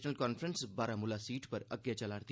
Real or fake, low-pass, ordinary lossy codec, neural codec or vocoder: real; none; none; none